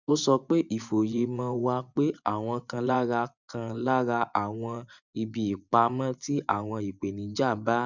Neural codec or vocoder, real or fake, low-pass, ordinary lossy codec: vocoder, 24 kHz, 100 mel bands, Vocos; fake; 7.2 kHz; none